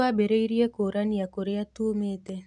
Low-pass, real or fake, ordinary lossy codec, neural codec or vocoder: 10.8 kHz; real; none; none